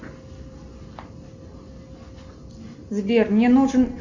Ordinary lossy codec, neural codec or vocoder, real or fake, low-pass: Opus, 64 kbps; none; real; 7.2 kHz